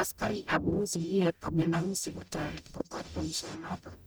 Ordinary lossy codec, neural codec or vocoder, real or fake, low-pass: none; codec, 44.1 kHz, 0.9 kbps, DAC; fake; none